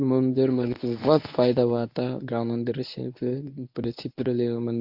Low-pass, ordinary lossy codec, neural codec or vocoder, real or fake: 5.4 kHz; AAC, 48 kbps; codec, 24 kHz, 0.9 kbps, WavTokenizer, medium speech release version 1; fake